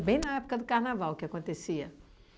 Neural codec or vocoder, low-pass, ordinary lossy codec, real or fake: none; none; none; real